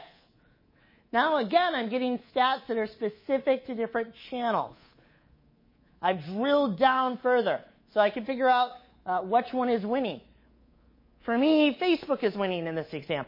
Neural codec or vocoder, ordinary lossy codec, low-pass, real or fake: codec, 24 kHz, 3.1 kbps, DualCodec; MP3, 24 kbps; 5.4 kHz; fake